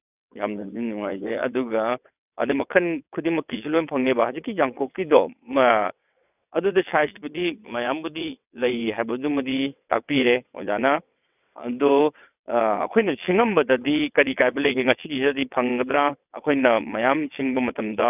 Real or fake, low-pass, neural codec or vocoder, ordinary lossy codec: fake; 3.6 kHz; vocoder, 22.05 kHz, 80 mel bands, WaveNeXt; none